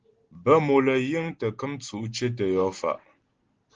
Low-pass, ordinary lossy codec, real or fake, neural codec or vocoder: 7.2 kHz; Opus, 16 kbps; real; none